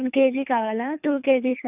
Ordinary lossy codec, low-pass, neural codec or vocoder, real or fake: none; 3.6 kHz; codec, 24 kHz, 3 kbps, HILCodec; fake